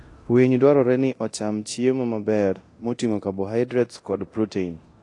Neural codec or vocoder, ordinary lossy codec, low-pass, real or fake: codec, 24 kHz, 0.9 kbps, DualCodec; AAC, 48 kbps; 10.8 kHz; fake